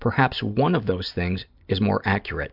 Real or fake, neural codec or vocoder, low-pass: real; none; 5.4 kHz